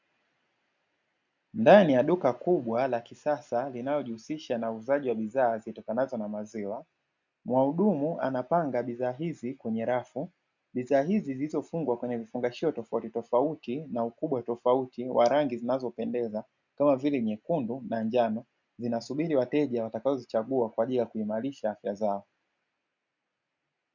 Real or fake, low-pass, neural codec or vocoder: real; 7.2 kHz; none